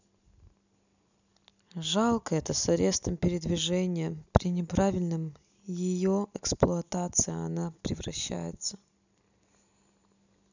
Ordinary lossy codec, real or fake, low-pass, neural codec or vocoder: none; real; 7.2 kHz; none